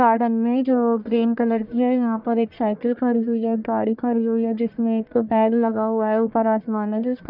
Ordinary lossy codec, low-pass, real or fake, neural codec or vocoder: none; 5.4 kHz; fake; codec, 44.1 kHz, 1.7 kbps, Pupu-Codec